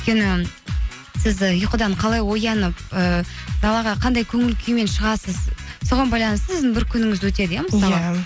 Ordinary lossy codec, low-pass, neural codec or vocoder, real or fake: none; none; none; real